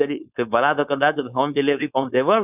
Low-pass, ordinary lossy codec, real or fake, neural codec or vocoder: 3.6 kHz; none; fake; codec, 16 kHz, 4.8 kbps, FACodec